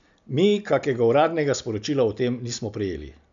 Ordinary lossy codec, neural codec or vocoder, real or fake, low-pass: none; none; real; 7.2 kHz